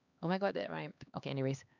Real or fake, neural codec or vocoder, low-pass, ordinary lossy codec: fake; codec, 16 kHz, 2 kbps, X-Codec, HuBERT features, trained on LibriSpeech; 7.2 kHz; none